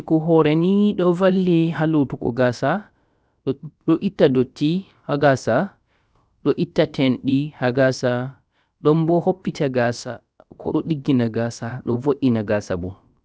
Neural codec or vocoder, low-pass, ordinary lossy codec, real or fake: codec, 16 kHz, about 1 kbps, DyCAST, with the encoder's durations; none; none; fake